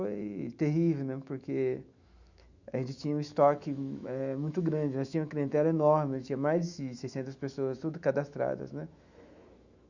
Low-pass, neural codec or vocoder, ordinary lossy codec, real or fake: 7.2 kHz; autoencoder, 48 kHz, 128 numbers a frame, DAC-VAE, trained on Japanese speech; Opus, 64 kbps; fake